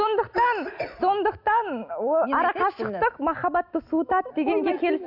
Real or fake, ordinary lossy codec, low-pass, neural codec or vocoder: real; none; 5.4 kHz; none